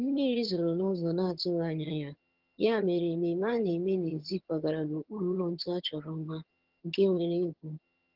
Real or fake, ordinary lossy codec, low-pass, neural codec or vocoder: fake; Opus, 16 kbps; 5.4 kHz; vocoder, 22.05 kHz, 80 mel bands, HiFi-GAN